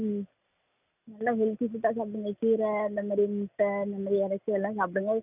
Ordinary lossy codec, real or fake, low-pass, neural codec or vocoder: none; real; 3.6 kHz; none